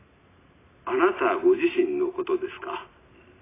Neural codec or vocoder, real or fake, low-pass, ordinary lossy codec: none; real; 3.6 kHz; AAC, 16 kbps